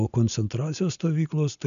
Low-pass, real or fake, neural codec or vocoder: 7.2 kHz; real; none